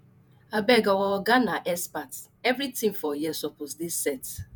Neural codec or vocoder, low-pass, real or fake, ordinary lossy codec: vocoder, 48 kHz, 128 mel bands, Vocos; none; fake; none